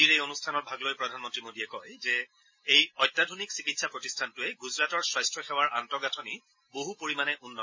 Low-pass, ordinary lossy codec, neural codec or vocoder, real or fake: 7.2 kHz; MP3, 32 kbps; none; real